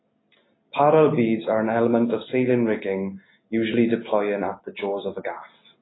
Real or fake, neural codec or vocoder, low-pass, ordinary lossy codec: real; none; 7.2 kHz; AAC, 16 kbps